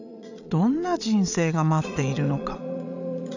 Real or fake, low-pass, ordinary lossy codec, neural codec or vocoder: fake; 7.2 kHz; none; vocoder, 22.05 kHz, 80 mel bands, Vocos